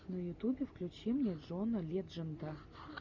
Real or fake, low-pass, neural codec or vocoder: real; 7.2 kHz; none